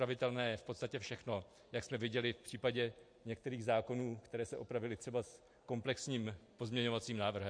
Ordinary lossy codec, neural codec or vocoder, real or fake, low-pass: MP3, 48 kbps; none; real; 9.9 kHz